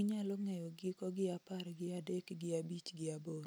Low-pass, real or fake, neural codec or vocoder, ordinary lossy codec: none; real; none; none